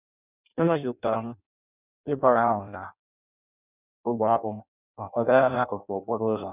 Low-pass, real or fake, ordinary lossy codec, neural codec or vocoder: 3.6 kHz; fake; none; codec, 16 kHz in and 24 kHz out, 0.6 kbps, FireRedTTS-2 codec